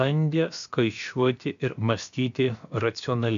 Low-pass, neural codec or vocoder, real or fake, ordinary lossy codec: 7.2 kHz; codec, 16 kHz, about 1 kbps, DyCAST, with the encoder's durations; fake; MP3, 64 kbps